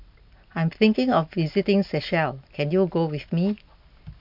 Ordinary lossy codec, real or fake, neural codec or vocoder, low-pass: MP3, 48 kbps; real; none; 5.4 kHz